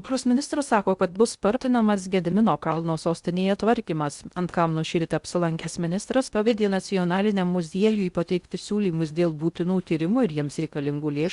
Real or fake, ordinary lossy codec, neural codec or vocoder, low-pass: fake; Opus, 64 kbps; codec, 16 kHz in and 24 kHz out, 0.6 kbps, FocalCodec, streaming, 2048 codes; 10.8 kHz